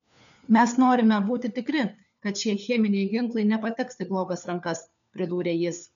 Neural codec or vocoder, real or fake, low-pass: codec, 16 kHz, 4 kbps, FunCodec, trained on LibriTTS, 50 frames a second; fake; 7.2 kHz